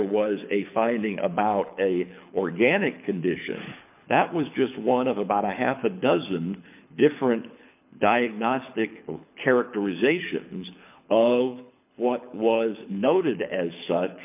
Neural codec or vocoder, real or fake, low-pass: codec, 24 kHz, 6 kbps, HILCodec; fake; 3.6 kHz